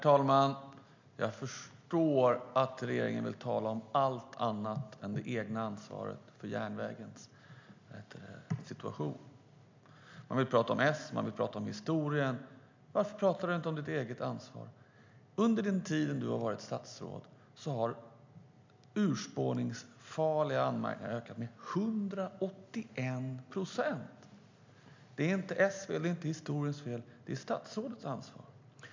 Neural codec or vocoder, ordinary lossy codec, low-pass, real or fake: none; MP3, 64 kbps; 7.2 kHz; real